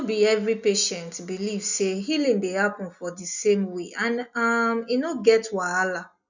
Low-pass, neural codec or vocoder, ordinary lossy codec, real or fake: 7.2 kHz; none; none; real